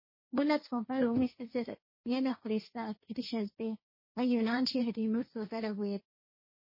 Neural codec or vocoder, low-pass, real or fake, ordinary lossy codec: codec, 16 kHz, 1.1 kbps, Voila-Tokenizer; 5.4 kHz; fake; MP3, 24 kbps